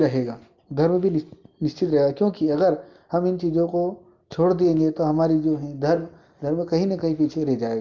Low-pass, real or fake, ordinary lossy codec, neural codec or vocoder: 7.2 kHz; real; Opus, 32 kbps; none